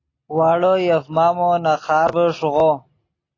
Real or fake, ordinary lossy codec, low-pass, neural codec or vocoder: real; AAC, 32 kbps; 7.2 kHz; none